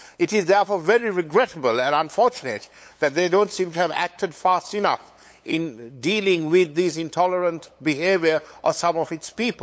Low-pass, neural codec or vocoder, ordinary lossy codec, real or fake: none; codec, 16 kHz, 8 kbps, FunCodec, trained on LibriTTS, 25 frames a second; none; fake